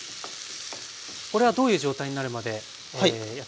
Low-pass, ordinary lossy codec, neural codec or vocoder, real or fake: none; none; none; real